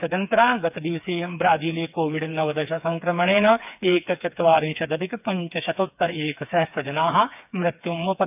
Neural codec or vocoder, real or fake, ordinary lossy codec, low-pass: codec, 16 kHz, 4 kbps, FreqCodec, smaller model; fake; none; 3.6 kHz